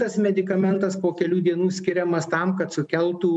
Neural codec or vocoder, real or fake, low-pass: none; real; 10.8 kHz